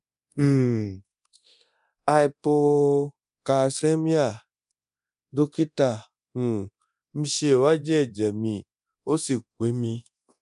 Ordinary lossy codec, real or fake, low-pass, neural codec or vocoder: none; fake; 10.8 kHz; codec, 24 kHz, 0.9 kbps, DualCodec